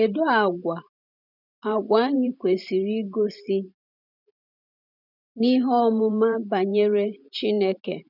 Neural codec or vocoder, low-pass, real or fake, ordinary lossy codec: vocoder, 44.1 kHz, 128 mel bands every 512 samples, BigVGAN v2; 5.4 kHz; fake; none